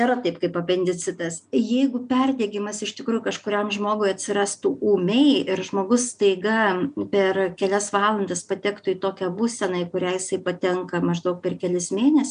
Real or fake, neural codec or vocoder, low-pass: real; none; 9.9 kHz